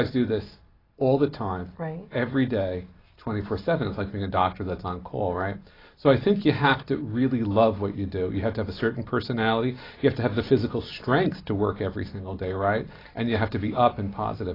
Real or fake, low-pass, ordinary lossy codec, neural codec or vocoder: real; 5.4 kHz; AAC, 24 kbps; none